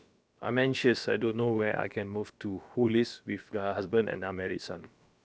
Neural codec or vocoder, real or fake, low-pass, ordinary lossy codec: codec, 16 kHz, about 1 kbps, DyCAST, with the encoder's durations; fake; none; none